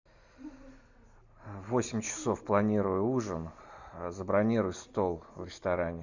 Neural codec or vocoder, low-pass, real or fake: none; 7.2 kHz; real